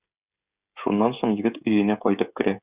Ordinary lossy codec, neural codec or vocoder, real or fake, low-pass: Opus, 64 kbps; codec, 16 kHz, 16 kbps, FreqCodec, smaller model; fake; 3.6 kHz